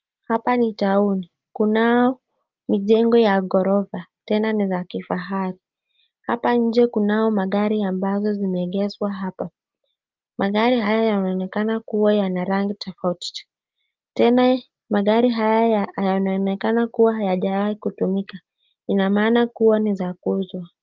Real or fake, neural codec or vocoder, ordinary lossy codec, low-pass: real; none; Opus, 32 kbps; 7.2 kHz